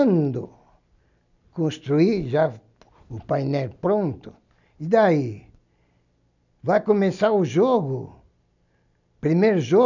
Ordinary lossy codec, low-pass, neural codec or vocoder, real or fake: none; 7.2 kHz; none; real